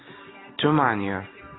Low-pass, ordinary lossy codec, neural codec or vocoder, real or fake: 7.2 kHz; AAC, 16 kbps; none; real